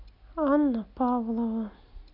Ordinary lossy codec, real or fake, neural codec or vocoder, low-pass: none; real; none; 5.4 kHz